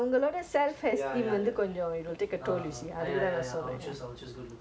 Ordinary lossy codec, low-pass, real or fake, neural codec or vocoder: none; none; real; none